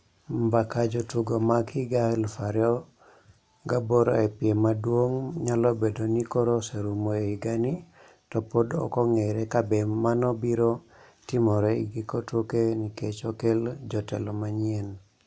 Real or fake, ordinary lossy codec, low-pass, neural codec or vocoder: real; none; none; none